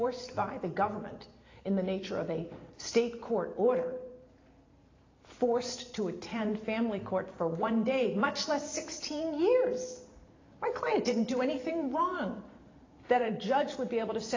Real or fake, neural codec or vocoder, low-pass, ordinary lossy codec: fake; vocoder, 44.1 kHz, 128 mel bands every 512 samples, BigVGAN v2; 7.2 kHz; AAC, 32 kbps